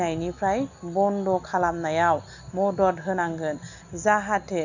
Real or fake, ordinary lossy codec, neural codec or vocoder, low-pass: real; none; none; 7.2 kHz